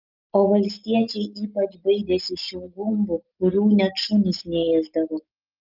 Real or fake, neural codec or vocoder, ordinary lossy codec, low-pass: real; none; Opus, 24 kbps; 5.4 kHz